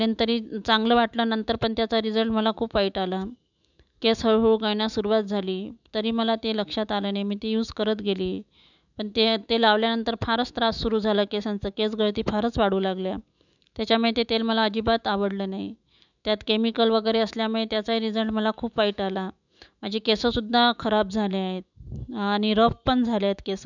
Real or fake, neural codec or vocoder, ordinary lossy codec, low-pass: fake; autoencoder, 48 kHz, 128 numbers a frame, DAC-VAE, trained on Japanese speech; none; 7.2 kHz